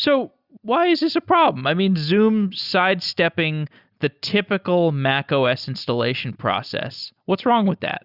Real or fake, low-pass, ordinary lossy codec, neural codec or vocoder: real; 5.4 kHz; Opus, 64 kbps; none